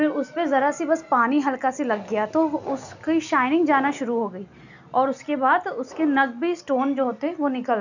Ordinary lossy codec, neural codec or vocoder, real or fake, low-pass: none; none; real; 7.2 kHz